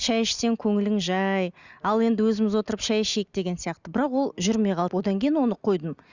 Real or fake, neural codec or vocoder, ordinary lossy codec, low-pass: real; none; Opus, 64 kbps; 7.2 kHz